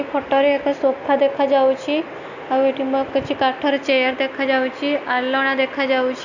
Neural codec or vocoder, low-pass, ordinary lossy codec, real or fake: none; 7.2 kHz; none; real